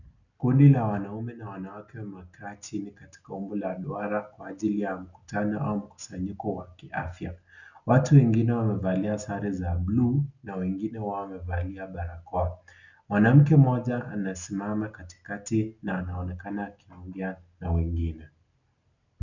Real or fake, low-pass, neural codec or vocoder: real; 7.2 kHz; none